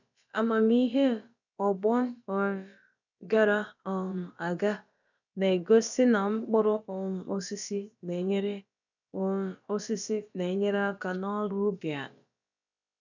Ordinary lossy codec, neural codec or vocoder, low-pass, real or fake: none; codec, 16 kHz, about 1 kbps, DyCAST, with the encoder's durations; 7.2 kHz; fake